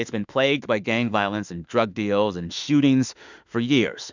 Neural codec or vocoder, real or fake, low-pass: autoencoder, 48 kHz, 32 numbers a frame, DAC-VAE, trained on Japanese speech; fake; 7.2 kHz